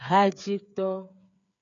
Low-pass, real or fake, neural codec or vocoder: 7.2 kHz; fake; codec, 16 kHz, 8 kbps, FreqCodec, smaller model